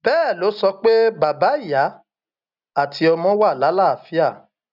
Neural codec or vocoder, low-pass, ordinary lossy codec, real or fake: none; 5.4 kHz; none; real